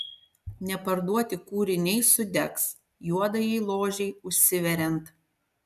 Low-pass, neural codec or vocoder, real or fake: 14.4 kHz; none; real